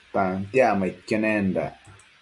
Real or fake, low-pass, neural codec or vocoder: real; 10.8 kHz; none